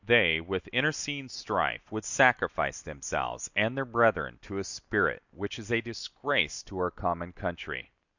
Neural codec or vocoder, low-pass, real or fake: none; 7.2 kHz; real